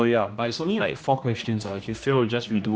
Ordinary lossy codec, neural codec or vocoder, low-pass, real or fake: none; codec, 16 kHz, 1 kbps, X-Codec, HuBERT features, trained on general audio; none; fake